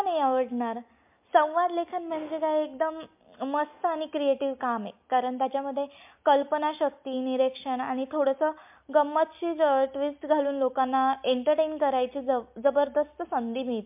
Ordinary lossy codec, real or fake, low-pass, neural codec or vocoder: MP3, 32 kbps; real; 3.6 kHz; none